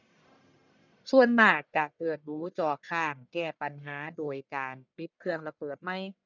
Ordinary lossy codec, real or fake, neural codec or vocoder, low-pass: none; fake; codec, 44.1 kHz, 1.7 kbps, Pupu-Codec; 7.2 kHz